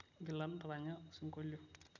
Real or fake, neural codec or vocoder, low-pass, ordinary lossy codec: real; none; 7.2 kHz; none